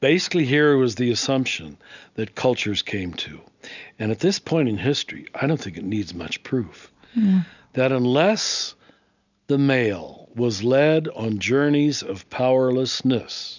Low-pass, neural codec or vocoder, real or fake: 7.2 kHz; none; real